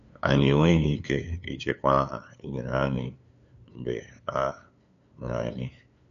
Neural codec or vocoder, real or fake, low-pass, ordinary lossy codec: codec, 16 kHz, 2 kbps, FunCodec, trained on LibriTTS, 25 frames a second; fake; 7.2 kHz; none